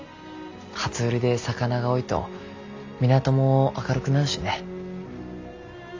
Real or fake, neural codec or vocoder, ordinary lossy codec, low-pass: real; none; AAC, 48 kbps; 7.2 kHz